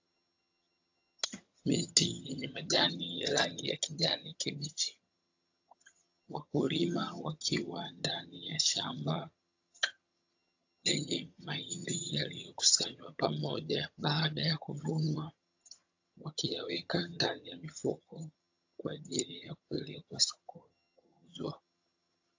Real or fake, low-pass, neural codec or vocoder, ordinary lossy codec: fake; 7.2 kHz; vocoder, 22.05 kHz, 80 mel bands, HiFi-GAN; AAC, 48 kbps